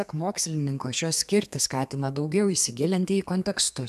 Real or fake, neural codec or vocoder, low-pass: fake; codec, 32 kHz, 1.9 kbps, SNAC; 14.4 kHz